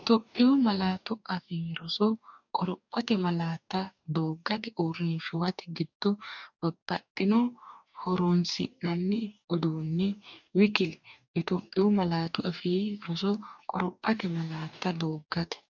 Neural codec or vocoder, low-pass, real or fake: codec, 44.1 kHz, 2.6 kbps, DAC; 7.2 kHz; fake